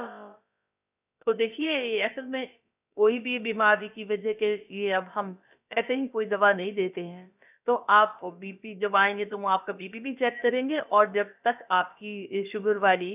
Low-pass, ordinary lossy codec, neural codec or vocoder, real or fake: 3.6 kHz; none; codec, 16 kHz, about 1 kbps, DyCAST, with the encoder's durations; fake